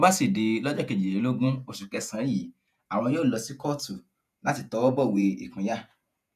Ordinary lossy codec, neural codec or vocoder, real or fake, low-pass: none; vocoder, 48 kHz, 128 mel bands, Vocos; fake; 14.4 kHz